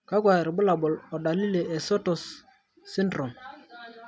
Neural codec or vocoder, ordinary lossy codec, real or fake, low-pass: none; none; real; none